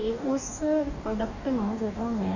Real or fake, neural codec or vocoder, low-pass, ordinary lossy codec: fake; codec, 44.1 kHz, 2.6 kbps, DAC; 7.2 kHz; none